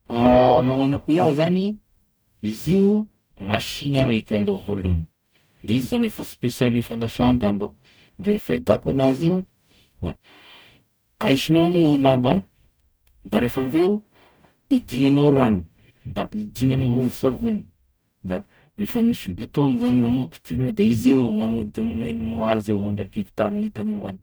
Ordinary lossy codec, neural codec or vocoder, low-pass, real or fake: none; codec, 44.1 kHz, 0.9 kbps, DAC; none; fake